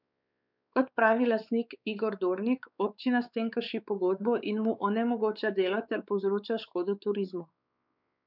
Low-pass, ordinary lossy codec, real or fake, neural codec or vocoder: 5.4 kHz; none; fake; codec, 16 kHz, 4 kbps, X-Codec, WavLM features, trained on Multilingual LibriSpeech